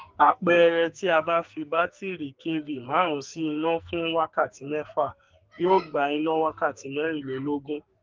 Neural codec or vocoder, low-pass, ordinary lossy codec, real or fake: codec, 44.1 kHz, 2.6 kbps, SNAC; 7.2 kHz; Opus, 24 kbps; fake